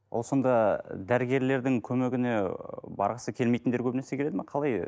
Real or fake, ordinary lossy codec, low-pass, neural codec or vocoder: real; none; none; none